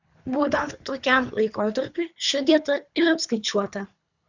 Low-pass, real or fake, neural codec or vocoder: 7.2 kHz; fake; codec, 24 kHz, 3 kbps, HILCodec